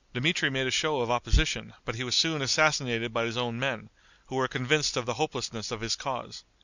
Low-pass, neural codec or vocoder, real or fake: 7.2 kHz; none; real